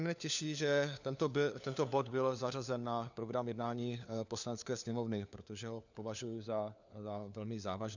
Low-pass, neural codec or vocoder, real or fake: 7.2 kHz; codec, 16 kHz, 4 kbps, FunCodec, trained on LibriTTS, 50 frames a second; fake